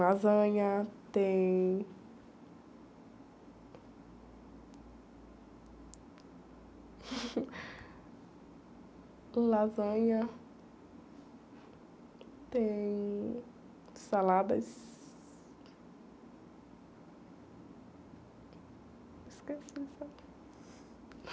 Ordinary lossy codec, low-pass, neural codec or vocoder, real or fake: none; none; none; real